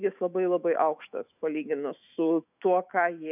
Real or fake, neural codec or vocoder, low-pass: fake; autoencoder, 48 kHz, 128 numbers a frame, DAC-VAE, trained on Japanese speech; 3.6 kHz